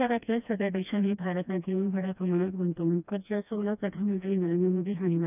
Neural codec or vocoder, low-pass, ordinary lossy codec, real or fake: codec, 16 kHz, 1 kbps, FreqCodec, smaller model; 3.6 kHz; none; fake